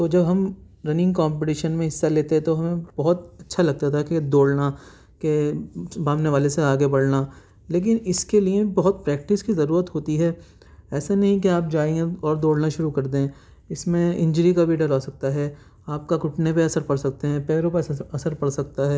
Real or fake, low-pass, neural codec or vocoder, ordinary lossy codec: real; none; none; none